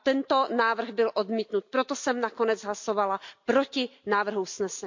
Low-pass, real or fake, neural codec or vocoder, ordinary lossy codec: 7.2 kHz; real; none; MP3, 48 kbps